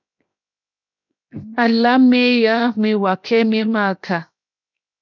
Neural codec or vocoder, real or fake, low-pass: codec, 16 kHz, 0.7 kbps, FocalCodec; fake; 7.2 kHz